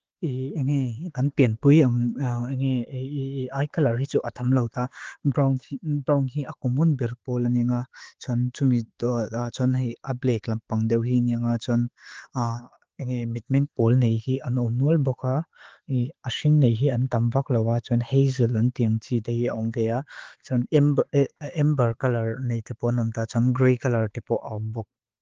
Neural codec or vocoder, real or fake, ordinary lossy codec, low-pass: none; real; Opus, 16 kbps; 7.2 kHz